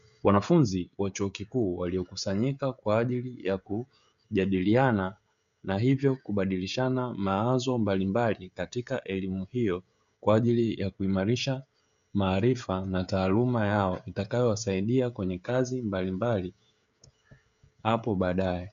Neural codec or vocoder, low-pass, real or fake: codec, 16 kHz, 16 kbps, FreqCodec, smaller model; 7.2 kHz; fake